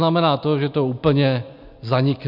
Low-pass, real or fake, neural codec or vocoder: 5.4 kHz; real; none